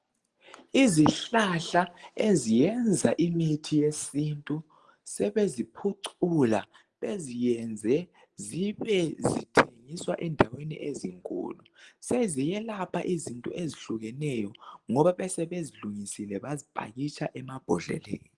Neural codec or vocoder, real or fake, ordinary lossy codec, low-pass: none; real; Opus, 24 kbps; 10.8 kHz